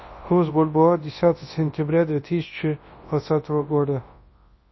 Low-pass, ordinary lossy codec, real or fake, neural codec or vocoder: 7.2 kHz; MP3, 24 kbps; fake; codec, 24 kHz, 0.9 kbps, WavTokenizer, large speech release